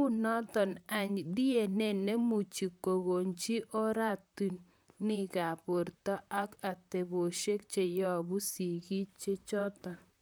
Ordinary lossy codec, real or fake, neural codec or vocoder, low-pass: none; fake; vocoder, 44.1 kHz, 128 mel bands, Pupu-Vocoder; none